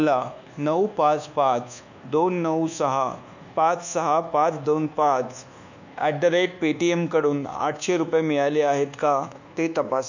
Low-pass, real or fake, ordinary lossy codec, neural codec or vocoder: 7.2 kHz; fake; none; codec, 24 kHz, 1.2 kbps, DualCodec